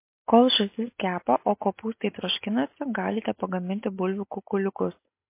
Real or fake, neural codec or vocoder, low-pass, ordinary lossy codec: real; none; 3.6 kHz; MP3, 24 kbps